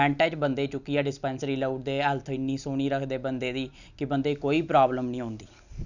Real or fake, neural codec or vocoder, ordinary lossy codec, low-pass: real; none; none; 7.2 kHz